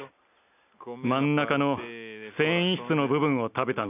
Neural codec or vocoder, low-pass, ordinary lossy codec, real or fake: none; 3.6 kHz; none; real